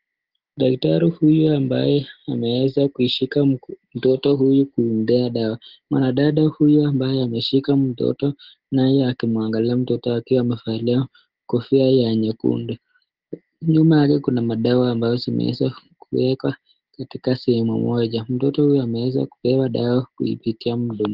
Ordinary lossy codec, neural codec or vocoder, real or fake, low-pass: Opus, 16 kbps; none; real; 5.4 kHz